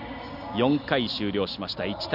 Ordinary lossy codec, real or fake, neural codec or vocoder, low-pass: none; real; none; 5.4 kHz